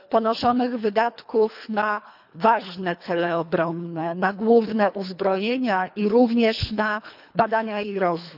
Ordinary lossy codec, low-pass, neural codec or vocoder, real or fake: none; 5.4 kHz; codec, 24 kHz, 3 kbps, HILCodec; fake